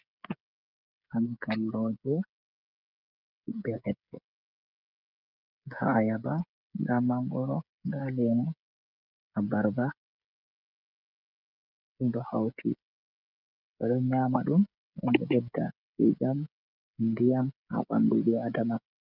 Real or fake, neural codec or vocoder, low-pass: fake; codec, 16 kHz, 16 kbps, FreqCodec, smaller model; 5.4 kHz